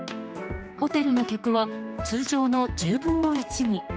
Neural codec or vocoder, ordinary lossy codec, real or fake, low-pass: codec, 16 kHz, 2 kbps, X-Codec, HuBERT features, trained on general audio; none; fake; none